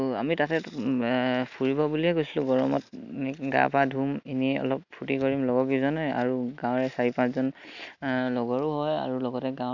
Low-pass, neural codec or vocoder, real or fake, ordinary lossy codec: 7.2 kHz; none; real; none